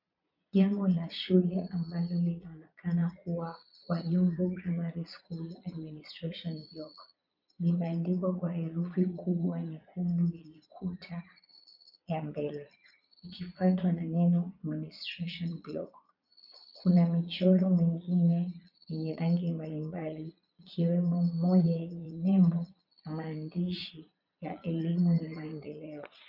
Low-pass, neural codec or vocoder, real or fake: 5.4 kHz; vocoder, 22.05 kHz, 80 mel bands, Vocos; fake